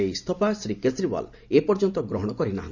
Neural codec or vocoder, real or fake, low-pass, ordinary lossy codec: none; real; 7.2 kHz; none